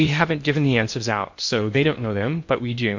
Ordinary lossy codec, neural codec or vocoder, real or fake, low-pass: MP3, 48 kbps; codec, 16 kHz in and 24 kHz out, 0.8 kbps, FocalCodec, streaming, 65536 codes; fake; 7.2 kHz